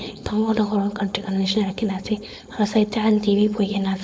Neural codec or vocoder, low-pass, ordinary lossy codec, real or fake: codec, 16 kHz, 4.8 kbps, FACodec; none; none; fake